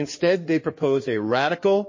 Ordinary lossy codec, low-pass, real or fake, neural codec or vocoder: MP3, 32 kbps; 7.2 kHz; fake; codec, 16 kHz in and 24 kHz out, 2.2 kbps, FireRedTTS-2 codec